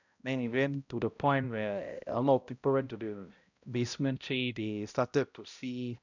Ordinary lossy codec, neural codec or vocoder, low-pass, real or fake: none; codec, 16 kHz, 0.5 kbps, X-Codec, HuBERT features, trained on balanced general audio; 7.2 kHz; fake